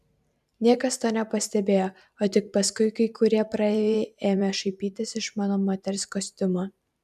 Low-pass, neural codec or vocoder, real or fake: 14.4 kHz; vocoder, 44.1 kHz, 128 mel bands every 512 samples, BigVGAN v2; fake